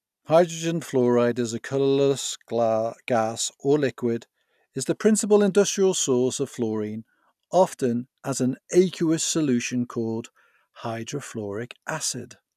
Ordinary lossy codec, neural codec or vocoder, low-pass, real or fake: none; none; 14.4 kHz; real